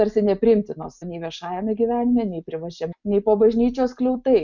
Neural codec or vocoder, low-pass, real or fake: none; 7.2 kHz; real